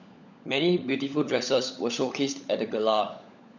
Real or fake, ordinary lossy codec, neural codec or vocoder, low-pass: fake; none; codec, 16 kHz, 16 kbps, FunCodec, trained on LibriTTS, 50 frames a second; 7.2 kHz